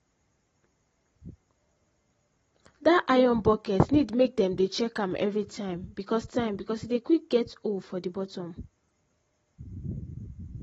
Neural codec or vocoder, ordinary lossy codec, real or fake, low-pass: none; AAC, 24 kbps; real; 19.8 kHz